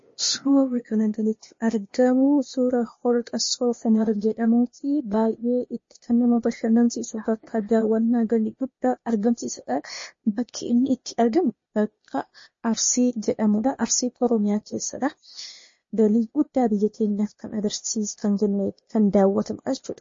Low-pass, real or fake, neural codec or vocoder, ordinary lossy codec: 7.2 kHz; fake; codec, 16 kHz, 0.8 kbps, ZipCodec; MP3, 32 kbps